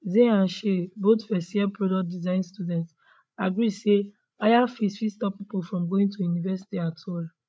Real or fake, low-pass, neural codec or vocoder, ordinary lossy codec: fake; none; codec, 16 kHz, 16 kbps, FreqCodec, larger model; none